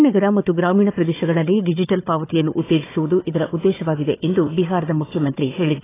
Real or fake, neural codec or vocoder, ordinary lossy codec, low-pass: fake; codec, 16 kHz, 4 kbps, FunCodec, trained on Chinese and English, 50 frames a second; AAC, 16 kbps; 3.6 kHz